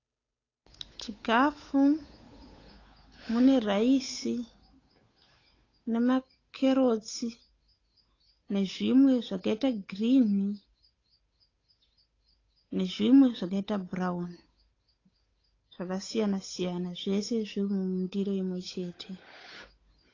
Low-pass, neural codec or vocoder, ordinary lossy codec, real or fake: 7.2 kHz; codec, 16 kHz, 8 kbps, FunCodec, trained on Chinese and English, 25 frames a second; AAC, 32 kbps; fake